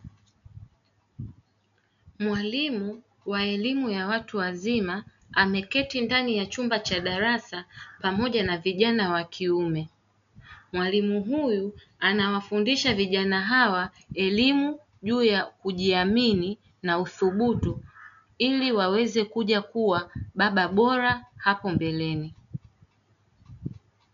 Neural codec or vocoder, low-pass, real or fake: none; 7.2 kHz; real